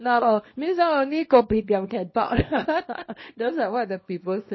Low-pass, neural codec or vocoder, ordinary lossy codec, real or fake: 7.2 kHz; codec, 24 kHz, 0.9 kbps, WavTokenizer, small release; MP3, 24 kbps; fake